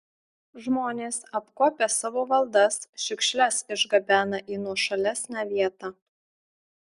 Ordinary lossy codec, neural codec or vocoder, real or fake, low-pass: Opus, 64 kbps; none; real; 10.8 kHz